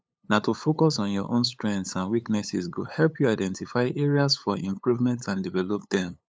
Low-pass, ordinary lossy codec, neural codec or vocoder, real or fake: none; none; codec, 16 kHz, 8 kbps, FunCodec, trained on LibriTTS, 25 frames a second; fake